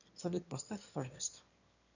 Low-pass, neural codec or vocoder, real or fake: 7.2 kHz; autoencoder, 22.05 kHz, a latent of 192 numbers a frame, VITS, trained on one speaker; fake